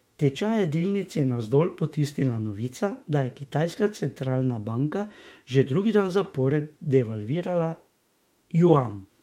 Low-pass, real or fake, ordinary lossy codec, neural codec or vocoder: 19.8 kHz; fake; MP3, 64 kbps; autoencoder, 48 kHz, 32 numbers a frame, DAC-VAE, trained on Japanese speech